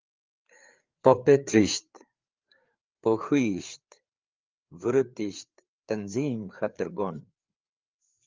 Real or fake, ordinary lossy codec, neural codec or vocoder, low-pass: fake; Opus, 32 kbps; codec, 16 kHz, 4 kbps, FreqCodec, larger model; 7.2 kHz